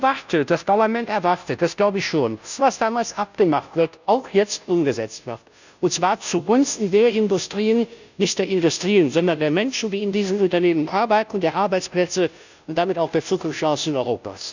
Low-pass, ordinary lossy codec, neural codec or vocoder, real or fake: 7.2 kHz; none; codec, 16 kHz, 0.5 kbps, FunCodec, trained on Chinese and English, 25 frames a second; fake